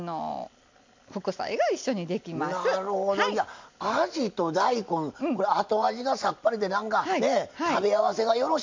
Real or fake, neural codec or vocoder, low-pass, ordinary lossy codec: real; none; 7.2 kHz; MP3, 48 kbps